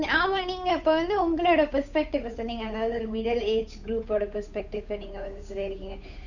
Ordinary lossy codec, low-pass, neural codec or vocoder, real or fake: none; 7.2 kHz; codec, 16 kHz, 8 kbps, FunCodec, trained on Chinese and English, 25 frames a second; fake